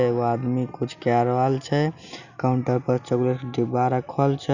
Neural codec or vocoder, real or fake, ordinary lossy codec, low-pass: none; real; none; 7.2 kHz